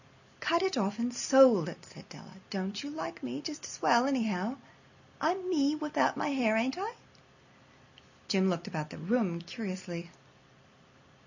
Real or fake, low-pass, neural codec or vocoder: real; 7.2 kHz; none